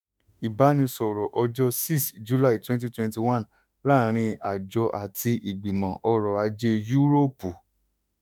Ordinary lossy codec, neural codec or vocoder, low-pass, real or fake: none; autoencoder, 48 kHz, 32 numbers a frame, DAC-VAE, trained on Japanese speech; none; fake